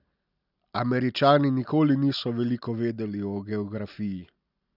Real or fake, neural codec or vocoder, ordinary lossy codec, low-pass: real; none; none; 5.4 kHz